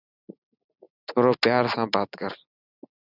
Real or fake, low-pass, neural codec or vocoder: real; 5.4 kHz; none